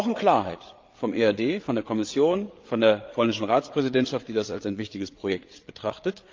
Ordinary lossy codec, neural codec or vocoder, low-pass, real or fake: Opus, 24 kbps; codec, 16 kHz, 8 kbps, FreqCodec, larger model; 7.2 kHz; fake